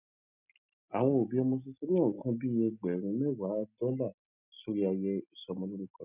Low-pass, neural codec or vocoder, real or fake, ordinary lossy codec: 3.6 kHz; none; real; none